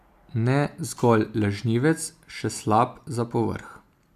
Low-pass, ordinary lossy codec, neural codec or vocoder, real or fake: 14.4 kHz; AAC, 96 kbps; none; real